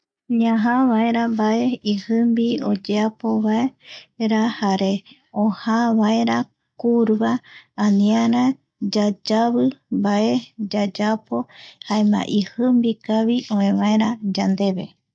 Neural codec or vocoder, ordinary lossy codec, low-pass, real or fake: none; none; 9.9 kHz; real